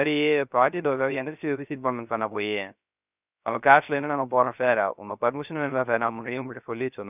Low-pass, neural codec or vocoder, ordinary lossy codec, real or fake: 3.6 kHz; codec, 16 kHz, 0.3 kbps, FocalCodec; none; fake